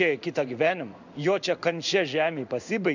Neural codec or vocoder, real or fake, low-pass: codec, 16 kHz in and 24 kHz out, 1 kbps, XY-Tokenizer; fake; 7.2 kHz